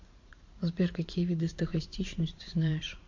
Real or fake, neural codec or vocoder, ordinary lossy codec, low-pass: fake; vocoder, 44.1 kHz, 80 mel bands, Vocos; MP3, 48 kbps; 7.2 kHz